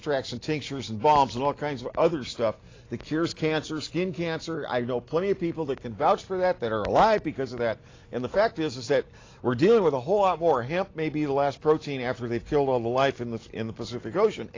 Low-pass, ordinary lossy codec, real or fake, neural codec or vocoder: 7.2 kHz; AAC, 32 kbps; real; none